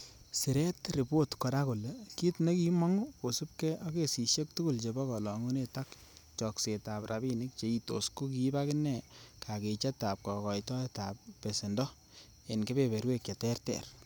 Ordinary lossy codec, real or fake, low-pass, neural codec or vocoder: none; real; none; none